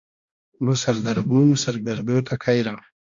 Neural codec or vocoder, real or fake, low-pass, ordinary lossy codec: codec, 16 kHz, 1 kbps, X-Codec, HuBERT features, trained on balanced general audio; fake; 7.2 kHz; AAC, 64 kbps